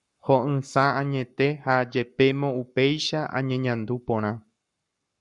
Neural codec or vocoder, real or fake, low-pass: codec, 44.1 kHz, 7.8 kbps, Pupu-Codec; fake; 10.8 kHz